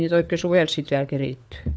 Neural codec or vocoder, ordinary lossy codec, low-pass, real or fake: codec, 16 kHz, 4 kbps, FunCodec, trained on LibriTTS, 50 frames a second; none; none; fake